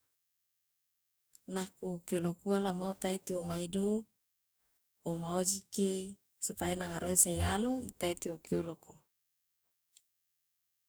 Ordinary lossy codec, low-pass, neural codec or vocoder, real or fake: none; none; codec, 44.1 kHz, 2.6 kbps, DAC; fake